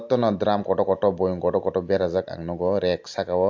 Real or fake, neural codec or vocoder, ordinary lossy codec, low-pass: real; none; MP3, 48 kbps; 7.2 kHz